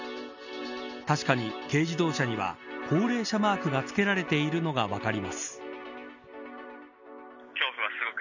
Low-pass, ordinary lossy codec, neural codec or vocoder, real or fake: 7.2 kHz; none; none; real